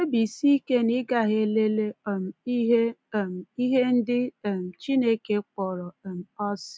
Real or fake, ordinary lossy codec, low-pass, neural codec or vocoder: real; none; none; none